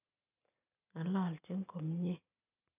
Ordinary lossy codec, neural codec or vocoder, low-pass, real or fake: none; none; 3.6 kHz; real